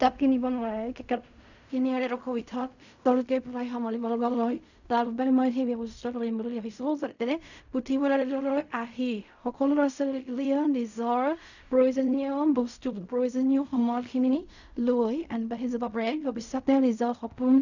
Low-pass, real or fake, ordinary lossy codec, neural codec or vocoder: 7.2 kHz; fake; none; codec, 16 kHz in and 24 kHz out, 0.4 kbps, LongCat-Audio-Codec, fine tuned four codebook decoder